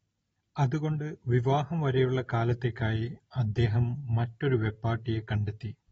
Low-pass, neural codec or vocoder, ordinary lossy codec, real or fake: 10.8 kHz; none; AAC, 24 kbps; real